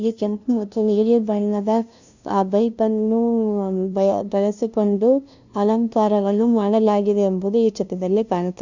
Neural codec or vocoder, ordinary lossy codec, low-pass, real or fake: codec, 16 kHz, 0.5 kbps, FunCodec, trained on LibriTTS, 25 frames a second; none; 7.2 kHz; fake